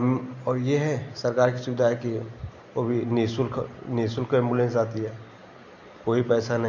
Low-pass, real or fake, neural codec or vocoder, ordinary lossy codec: 7.2 kHz; real; none; none